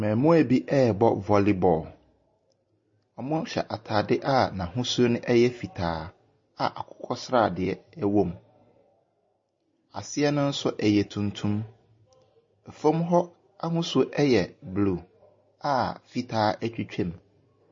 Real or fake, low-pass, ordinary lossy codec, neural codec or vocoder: real; 7.2 kHz; MP3, 32 kbps; none